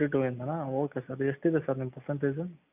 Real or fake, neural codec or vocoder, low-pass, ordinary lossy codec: real; none; 3.6 kHz; none